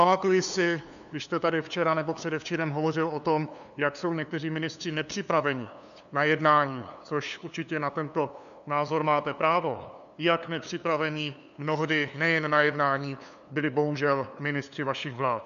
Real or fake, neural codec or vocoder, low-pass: fake; codec, 16 kHz, 2 kbps, FunCodec, trained on LibriTTS, 25 frames a second; 7.2 kHz